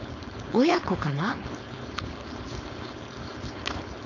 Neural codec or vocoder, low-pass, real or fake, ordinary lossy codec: codec, 16 kHz, 4.8 kbps, FACodec; 7.2 kHz; fake; none